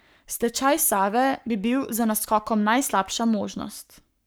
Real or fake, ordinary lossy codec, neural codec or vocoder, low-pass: fake; none; codec, 44.1 kHz, 7.8 kbps, Pupu-Codec; none